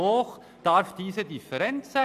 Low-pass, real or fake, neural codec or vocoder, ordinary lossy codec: 14.4 kHz; real; none; none